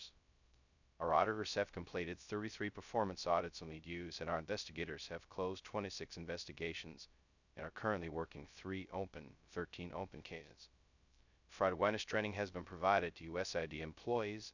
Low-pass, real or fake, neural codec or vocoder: 7.2 kHz; fake; codec, 16 kHz, 0.2 kbps, FocalCodec